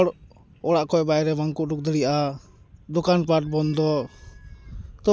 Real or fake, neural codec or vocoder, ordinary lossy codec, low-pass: real; none; none; none